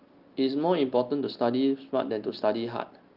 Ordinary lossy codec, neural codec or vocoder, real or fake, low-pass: Opus, 32 kbps; none; real; 5.4 kHz